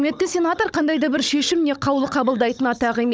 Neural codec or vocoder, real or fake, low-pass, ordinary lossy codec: codec, 16 kHz, 16 kbps, FunCodec, trained on Chinese and English, 50 frames a second; fake; none; none